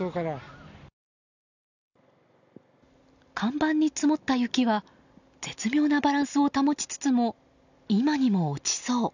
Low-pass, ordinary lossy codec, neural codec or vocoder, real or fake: 7.2 kHz; none; none; real